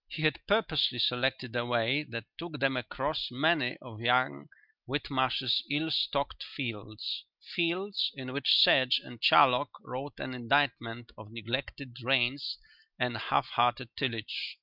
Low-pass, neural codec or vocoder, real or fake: 5.4 kHz; none; real